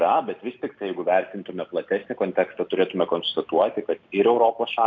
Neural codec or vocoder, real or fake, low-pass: none; real; 7.2 kHz